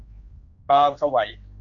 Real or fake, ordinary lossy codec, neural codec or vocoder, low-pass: fake; Opus, 64 kbps; codec, 16 kHz, 2 kbps, X-Codec, HuBERT features, trained on general audio; 7.2 kHz